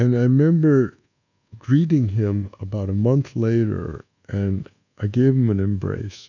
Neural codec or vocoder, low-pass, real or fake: codec, 24 kHz, 1.2 kbps, DualCodec; 7.2 kHz; fake